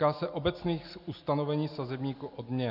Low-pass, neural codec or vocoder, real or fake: 5.4 kHz; none; real